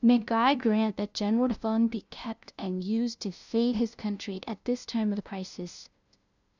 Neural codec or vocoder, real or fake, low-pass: codec, 16 kHz, 0.5 kbps, FunCodec, trained on LibriTTS, 25 frames a second; fake; 7.2 kHz